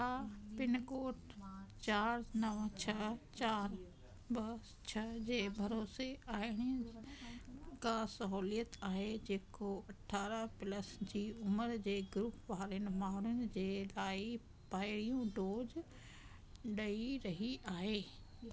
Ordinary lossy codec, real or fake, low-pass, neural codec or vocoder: none; real; none; none